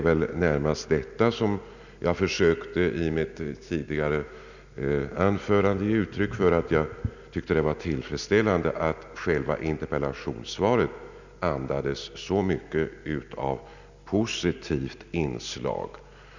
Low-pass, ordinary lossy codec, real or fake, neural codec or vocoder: 7.2 kHz; none; real; none